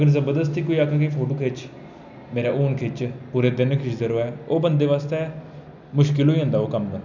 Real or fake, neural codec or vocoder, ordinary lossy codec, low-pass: real; none; none; 7.2 kHz